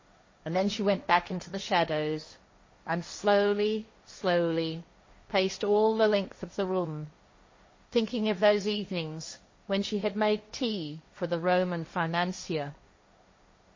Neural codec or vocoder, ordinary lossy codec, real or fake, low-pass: codec, 16 kHz, 1.1 kbps, Voila-Tokenizer; MP3, 32 kbps; fake; 7.2 kHz